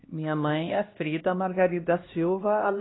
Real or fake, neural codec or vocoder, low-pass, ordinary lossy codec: fake; codec, 16 kHz, 1 kbps, X-Codec, HuBERT features, trained on LibriSpeech; 7.2 kHz; AAC, 16 kbps